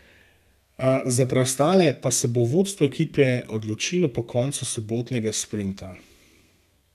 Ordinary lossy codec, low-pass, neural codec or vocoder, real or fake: none; 14.4 kHz; codec, 32 kHz, 1.9 kbps, SNAC; fake